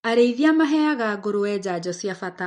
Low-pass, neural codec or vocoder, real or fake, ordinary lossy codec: 9.9 kHz; none; real; MP3, 48 kbps